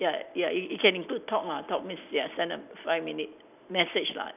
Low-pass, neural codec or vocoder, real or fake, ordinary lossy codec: 3.6 kHz; none; real; none